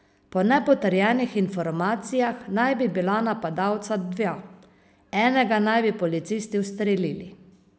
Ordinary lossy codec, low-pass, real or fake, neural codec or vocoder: none; none; real; none